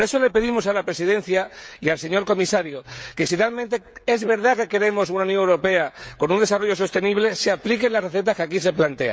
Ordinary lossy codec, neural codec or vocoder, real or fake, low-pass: none; codec, 16 kHz, 16 kbps, FreqCodec, smaller model; fake; none